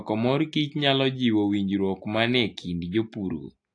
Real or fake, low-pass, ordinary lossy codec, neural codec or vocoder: real; 9.9 kHz; AAC, 48 kbps; none